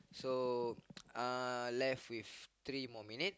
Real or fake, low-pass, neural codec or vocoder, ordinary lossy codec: real; none; none; none